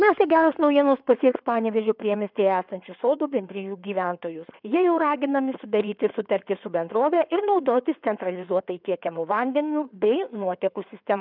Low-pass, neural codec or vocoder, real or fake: 5.4 kHz; codec, 16 kHz in and 24 kHz out, 2.2 kbps, FireRedTTS-2 codec; fake